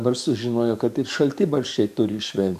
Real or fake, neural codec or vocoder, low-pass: fake; codec, 44.1 kHz, 7.8 kbps, DAC; 14.4 kHz